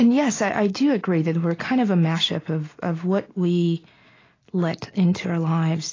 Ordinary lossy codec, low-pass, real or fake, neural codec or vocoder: AAC, 32 kbps; 7.2 kHz; real; none